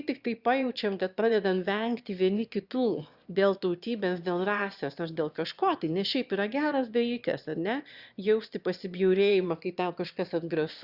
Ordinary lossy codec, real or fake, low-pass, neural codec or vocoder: Opus, 64 kbps; fake; 5.4 kHz; autoencoder, 22.05 kHz, a latent of 192 numbers a frame, VITS, trained on one speaker